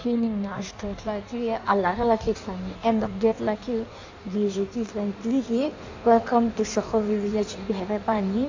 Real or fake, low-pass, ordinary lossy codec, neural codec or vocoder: fake; 7.2 kHz; AAC, 48 kbps; codec, 16 kHz in and 24 kHz out, 1.1 kbps, FireRedTTS-2 codec